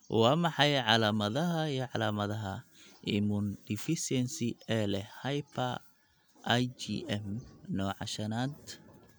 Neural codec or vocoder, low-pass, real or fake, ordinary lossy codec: none; none; real; none